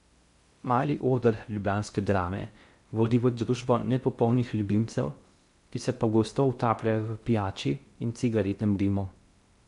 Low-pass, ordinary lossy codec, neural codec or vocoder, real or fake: 10.8 kHz; MP3, 64 kbps; codec, 16 kHz in and 24 kHz out, 0.6 kbps, FocalCodec, streaming, 2048 codes; fake